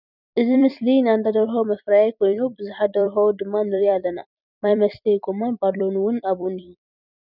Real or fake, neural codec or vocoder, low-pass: fake; vocoder, 44.1 kHz, 128 mel bands every 256 samples, BigVGAN v2; 5.4 kHz